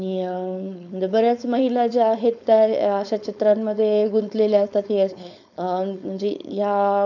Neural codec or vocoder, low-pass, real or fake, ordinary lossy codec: codec, 16 kHz, 4.8 kbps, FACodec; 7.2 kHz; fake; none